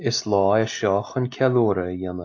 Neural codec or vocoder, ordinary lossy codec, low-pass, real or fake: none; AAC, 48 kbps; 7.2 kHz; real